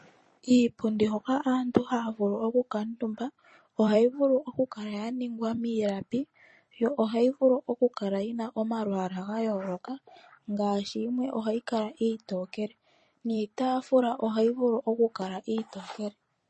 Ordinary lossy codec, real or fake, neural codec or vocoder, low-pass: MP3, 32 kbps; fake; vocoder, 44.1 kHz, 128 mel bands every 256 samples, BigVGAN v2; 10.8 kHz